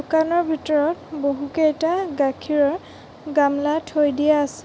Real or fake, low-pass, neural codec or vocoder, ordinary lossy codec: real; none; none; none